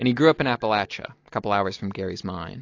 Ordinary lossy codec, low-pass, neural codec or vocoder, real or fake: AAC, 48 kbps; 7.2 kHz; none; real